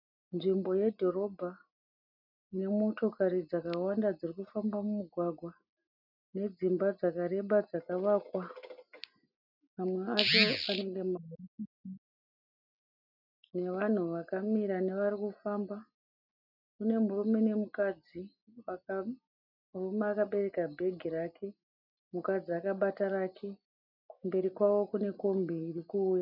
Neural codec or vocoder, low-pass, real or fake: none; 5.4 kHz; real